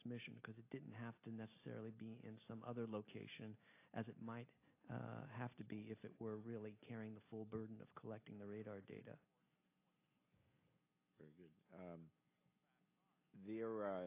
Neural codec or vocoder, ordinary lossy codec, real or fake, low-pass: none; AAC, 24 kbps; real; 3.6 kHz